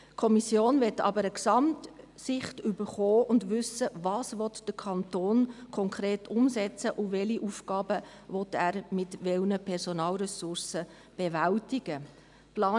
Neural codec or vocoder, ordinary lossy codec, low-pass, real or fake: none; none; 10.8 kHz; real